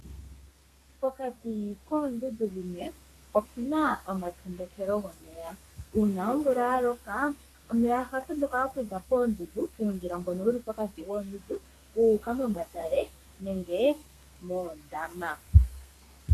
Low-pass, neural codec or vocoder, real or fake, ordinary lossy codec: 14.4 kHz; codec, 32 kHz, 1.9 kbps, SNAC; fake; AAC, 64 kbps